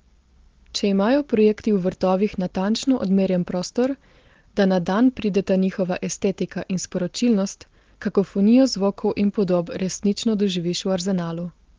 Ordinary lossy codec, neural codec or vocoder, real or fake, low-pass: Opus, 16 kbps; none; real; 7.2 kHz